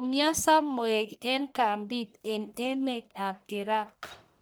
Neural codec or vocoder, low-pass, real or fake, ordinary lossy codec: codec, 44.1 kHz, 1.7 kbps, Pupu-Codec; none; fake; none